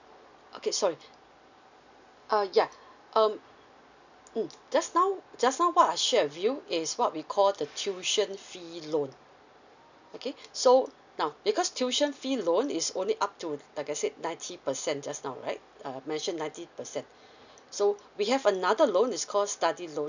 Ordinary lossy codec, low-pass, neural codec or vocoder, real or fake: none; 7.2 kHz; none; real